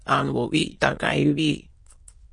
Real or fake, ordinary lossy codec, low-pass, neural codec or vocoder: fake; MP3, 48 kbps; 9.9 kHz; autoencoder, 22.05 kHz, a latent of 192 numbers a frame, VITS, trained on many speakers